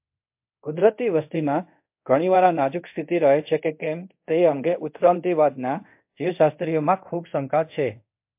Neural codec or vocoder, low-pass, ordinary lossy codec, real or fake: codec, 24 kHz, 0.5 kbps, DualCodec; 3.6 kHz; MP3, 32 kbps; fake